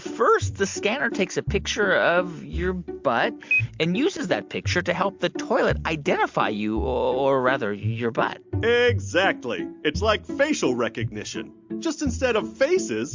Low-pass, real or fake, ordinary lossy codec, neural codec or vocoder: 7.2 kHz; real; MP3, 64 kbps; none